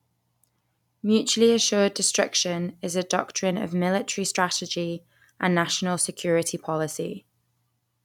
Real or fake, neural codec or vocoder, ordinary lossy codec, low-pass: real; none; none; 19.8 kHz